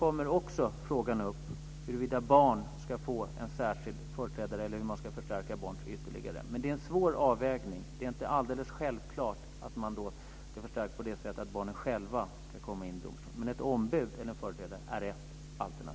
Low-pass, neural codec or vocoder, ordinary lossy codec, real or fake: none; none; none; real